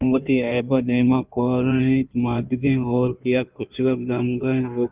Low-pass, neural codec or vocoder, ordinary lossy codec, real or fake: 3.6 kHz; codec, 16 kHz in and 24 kHz out, 1.1 kbps, FireRedTTS-2 codec; Opus, 32 kbps; fake